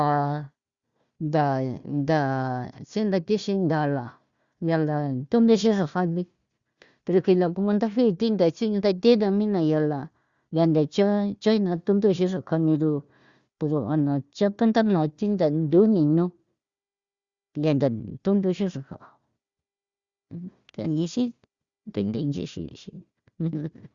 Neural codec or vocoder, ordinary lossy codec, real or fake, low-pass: codec, 16 kHz, 1 kbps, FunCodec, trained on Chinese and English, 50 frames a second; Opus, 64 kbps; fake; 7.2 kHz